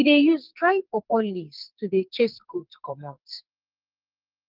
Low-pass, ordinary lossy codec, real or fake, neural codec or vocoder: 5.4 kHz; Opus, 32 kbps; fake; codec, 44.1 kHz, 2.6 kbps, SNAC